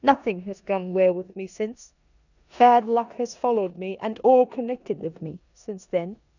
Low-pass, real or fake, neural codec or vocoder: 7.2 kHz; fake; codec, 16 kHz in and 24 kHz out, 0.9 kbps, LongCat-Audio-Codec, four codebook decoder